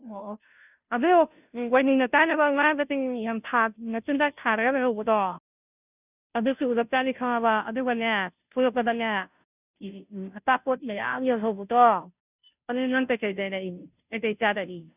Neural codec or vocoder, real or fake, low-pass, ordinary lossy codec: codec, 16 kHz, 0.5 kbps, FunCodec, trained on Chinese and English, 25 frames a second; fake; 3.6 kHz; none